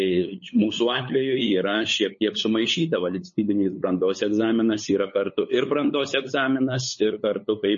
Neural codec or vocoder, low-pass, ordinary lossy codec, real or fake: codec, 16 kHz, 8 kbps, FunCodec, trained on LibriTTS, 25 frames a second; 7.2 kHz; MP3, 32 kbps; fake